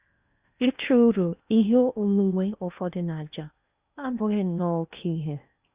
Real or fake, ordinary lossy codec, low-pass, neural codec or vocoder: fake; Opus, 64 kbps; 3.6 kHz; codec, 16 kHz in and 24 kHz out, 0.8 kbps, FocalCodec, streaming, 65536 codes